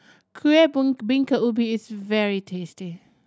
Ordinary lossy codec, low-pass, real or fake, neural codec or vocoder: none; none; real; none